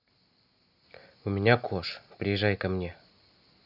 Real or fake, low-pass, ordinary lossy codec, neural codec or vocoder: real; 5.4 kHz; Opus, 64 kbps; none